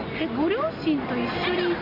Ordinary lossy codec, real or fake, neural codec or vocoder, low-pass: none; real; none; 5.4 kHz